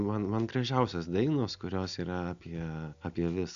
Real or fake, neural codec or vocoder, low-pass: real; none; 7.2 kHz